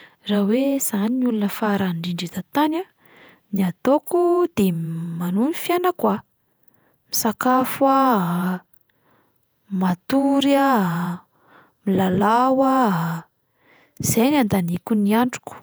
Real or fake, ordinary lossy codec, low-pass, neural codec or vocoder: fake; none; none; vocoder, 48 kHz, 128 mel bands, Vocos